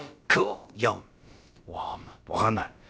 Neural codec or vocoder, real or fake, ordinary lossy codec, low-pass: codec, 16 kHz, about 1 kbps, DyCAST, with the encoder's durations; fake; none; none